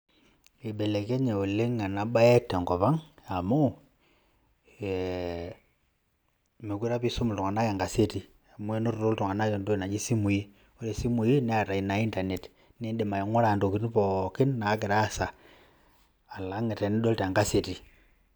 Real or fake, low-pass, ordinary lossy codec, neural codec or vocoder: real; none; none; none